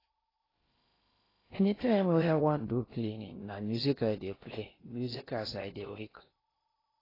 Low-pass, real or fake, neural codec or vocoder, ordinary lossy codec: 5.4 kHz; fake; codec, 16 kHz in and 24 kHz out, 0.8 kbps, FocalCodec, streaming, 65536 codes; AAC, 24 kbps